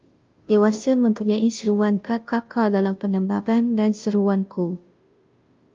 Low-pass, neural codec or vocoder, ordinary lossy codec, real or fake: 7.2 kHz; codec, 16 kHz, 0.5 kbps, FunCodec, trained on Chinese and English, 25 frames a second; Opus, 32 kbps; fake